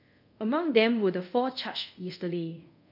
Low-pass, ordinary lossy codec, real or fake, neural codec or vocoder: 5.4 kHz; none; fake; codec, 24 kHz, 0.5 kbps, DualCodec